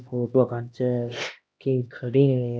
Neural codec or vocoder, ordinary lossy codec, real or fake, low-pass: codec, 16 kHz, 1 kbps, X-Codec, HuBERT features, trained on LibriSpeech; none; fake; none